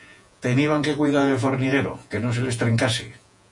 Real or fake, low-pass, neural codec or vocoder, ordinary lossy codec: fake; 10.8 kHz; vocoder, 48 kHz, 128 mel bands, Vocos; AAC, 64 kbps